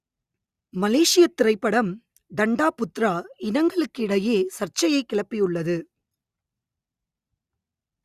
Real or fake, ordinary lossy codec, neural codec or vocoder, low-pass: real; Opus, 64 kbps; none; 14.4 kHz